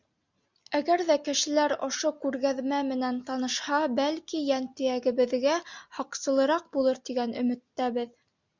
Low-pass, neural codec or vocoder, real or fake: 7.2 kHz; none; real